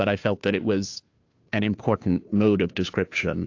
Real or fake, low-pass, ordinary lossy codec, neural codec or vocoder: fake; 7.2 kHz; AAC, 48 kbps; codec, 16 kHz, 2 kbps, FunCodec, trained on Chinese and English, 25 frames a second